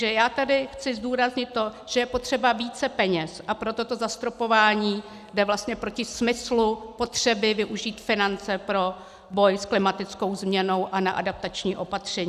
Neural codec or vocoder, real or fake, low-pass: none; real; 14.4 kHz